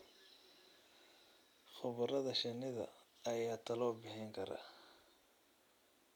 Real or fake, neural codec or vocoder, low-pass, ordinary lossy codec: real; none; 19.8 kHz; none